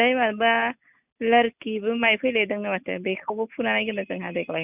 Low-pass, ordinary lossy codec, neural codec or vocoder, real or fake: 3.6 kHz; none; none; real